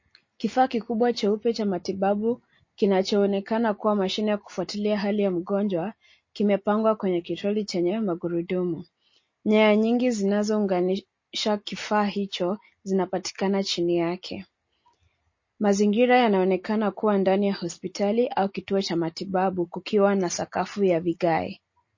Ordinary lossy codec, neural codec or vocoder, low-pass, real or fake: MP3, 32 kbps; none; 7.2 kHz; real